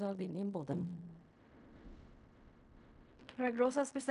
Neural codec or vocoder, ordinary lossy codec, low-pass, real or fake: codec, 16 kHz in and 24 kHz out, 0.4 kbps, LongCat-Audio-Codec, fine tuned four codebook decoder; none; 10.8 kHz; fake